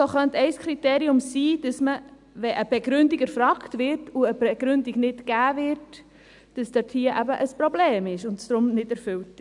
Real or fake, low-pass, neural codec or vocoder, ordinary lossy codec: real; 10.8 kHz; none; none